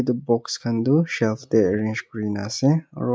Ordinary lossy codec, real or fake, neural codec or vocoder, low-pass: none; real; none; none